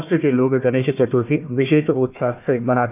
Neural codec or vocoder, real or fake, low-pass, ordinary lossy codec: codec, 16 kHz, 1 kbps, FunCodec, trained on Chinese and English, 50 frames a second; fake; 3.6 kHz; none